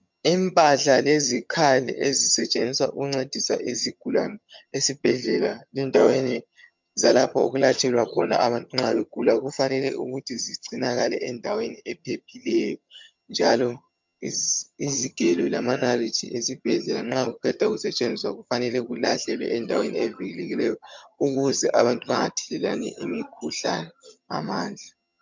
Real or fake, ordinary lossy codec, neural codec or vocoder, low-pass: fake; MP3, 64 kbps; vocoder, 22.05 kHz, 80 mel bands, HiFi-GAN; 7.2 kHz